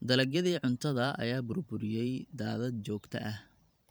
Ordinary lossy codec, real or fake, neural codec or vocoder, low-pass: none; fake; vocoder, 44.1 kHz, 128 mel bands every 512 samples, BigVGAN v2; none